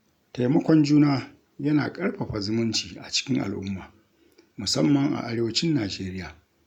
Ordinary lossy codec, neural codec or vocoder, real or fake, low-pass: none; none; real; 19.8 kHz